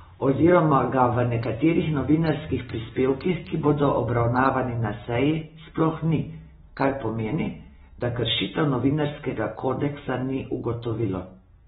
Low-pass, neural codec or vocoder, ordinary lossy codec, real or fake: 7.2 kHz; none; AAC, 16 kbps; real